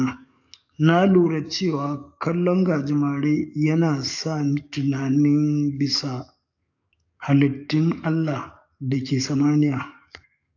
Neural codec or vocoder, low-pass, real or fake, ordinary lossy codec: codec, 44.1 kHz, 7.8 kbps, DAC; 7.2 kHz; fake; AAC, 48 kbps